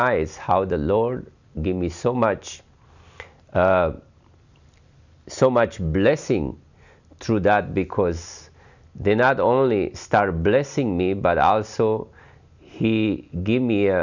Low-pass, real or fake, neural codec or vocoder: 7.2 kHz; real; none